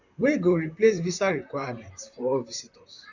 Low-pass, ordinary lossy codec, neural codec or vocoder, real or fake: 7.2 kHz; none; vocoder, 44.1 kHz, 128 mel bands, Pupu-Vocoder; fake